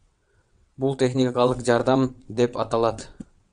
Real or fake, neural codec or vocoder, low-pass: fake; vocoder, 22.05 kHz, 80 mel bands, WaveNeXt; 9.9 kHz